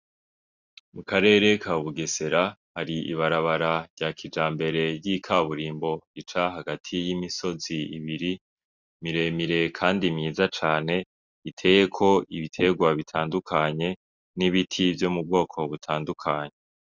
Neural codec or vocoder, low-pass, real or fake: none; 7.2 kHz; real